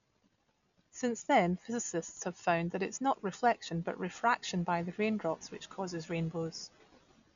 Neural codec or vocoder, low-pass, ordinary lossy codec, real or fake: none; 7.2 kHz; none; real